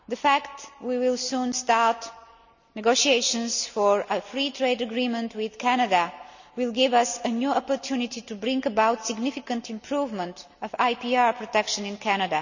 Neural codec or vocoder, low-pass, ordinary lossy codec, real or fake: none; 7.2 kHz; none; real